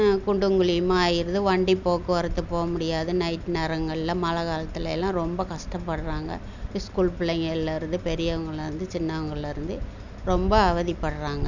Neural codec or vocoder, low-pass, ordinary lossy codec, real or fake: none; 7.2 kHz; none; real